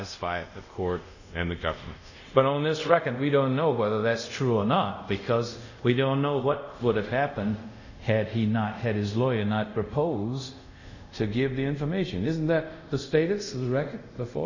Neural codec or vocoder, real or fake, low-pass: codec, 24 kHz, 0.5 kbps, DualCodec; fake; 7.2 kHz